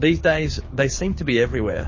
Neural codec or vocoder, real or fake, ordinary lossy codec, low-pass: codec, 24 kHz, 6 kbps, HILCodec; fake; MP3, 32 kbps; 7.2 kHz